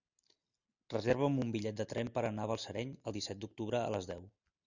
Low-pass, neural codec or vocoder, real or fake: 7.2 kHz; none; real